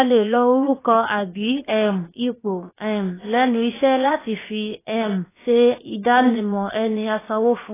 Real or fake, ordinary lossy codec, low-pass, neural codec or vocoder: fake; AAC, 16 kbps; 3.6 kHz; codec, 16 kHz, 0.7 kbps, FocalCodec